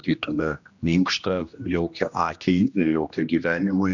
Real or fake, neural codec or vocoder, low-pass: fake; codec, 16 kHz, 1 kbps, X-Codec, HuBERT features, trained on general audio; 7.2 kHz